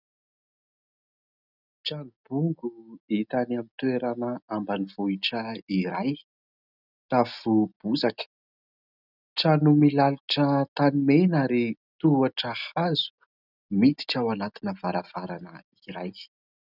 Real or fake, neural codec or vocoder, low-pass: real; none; 5.4 kHz